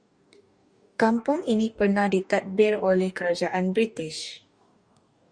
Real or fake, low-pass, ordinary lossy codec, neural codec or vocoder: fake; 9.9 kHz; Opus, 64 kbps; codec, 44.1 kHz, 2.6 kbps, DAC